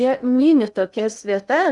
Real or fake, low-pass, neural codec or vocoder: fake; 10.8 kHz; codec, 16 kHz in and 24 kHz out, 0.6 kbps, FocalCodec, streaming, 2048 codes